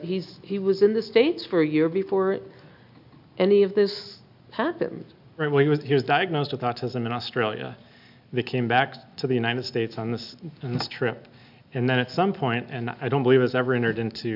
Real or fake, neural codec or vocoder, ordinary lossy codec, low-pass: real; none; AAC, 48 kbps; 5.4 kHz